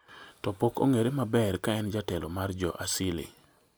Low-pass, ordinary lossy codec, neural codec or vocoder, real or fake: none; none; none; real